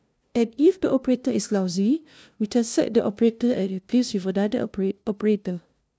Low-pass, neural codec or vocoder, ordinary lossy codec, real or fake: none; codec, 16 kHz, 0.5 kbps, FunCodec, trained on LibriTTS, 25 frames a second; none; fake